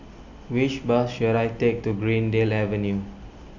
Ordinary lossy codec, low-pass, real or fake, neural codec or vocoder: none; 7.2 kHz; real; none